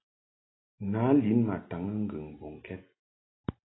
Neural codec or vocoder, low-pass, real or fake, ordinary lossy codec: none; 7.2 kHz; real; AAC, 16 kbps